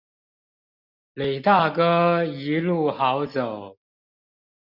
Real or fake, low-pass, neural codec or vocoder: real; 5.4 kHz; none